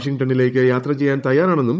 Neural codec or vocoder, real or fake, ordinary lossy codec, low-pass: codec, 16 kHz, 16 kbps, FunCodec, trained on Chinese and English, 50 frames a second; fake; none; none